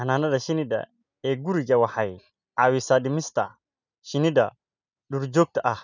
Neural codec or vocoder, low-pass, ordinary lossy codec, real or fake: none; 7.2 kHz; none; real